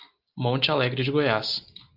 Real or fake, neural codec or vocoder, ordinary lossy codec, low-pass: real; none; Opus, 32 kbps; 5.4 kHz